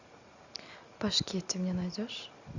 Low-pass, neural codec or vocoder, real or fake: 7.2 kHz; none; real